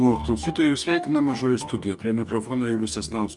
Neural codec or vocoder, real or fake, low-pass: codec, 44.1 kHz, 2.6 kbps, DAC; fake; 10.8 kHz